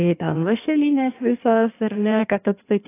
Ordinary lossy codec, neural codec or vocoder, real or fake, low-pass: AAC, 24 kbps; codec, 16 kHz in and 24 kHz out, 1.1 kbps, FireRedTTS-2 codec; fake; 3.6 kHz